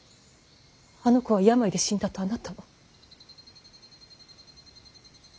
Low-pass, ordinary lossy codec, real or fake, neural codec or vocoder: none; none; real; none